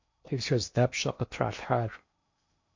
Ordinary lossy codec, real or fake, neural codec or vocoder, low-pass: MP3, 48 kbps; fake; codec, 16 kHz in and 24 kHz out, 0.8 kbps, FocalCodec, streaming, 65536 codes; 7.2 kHz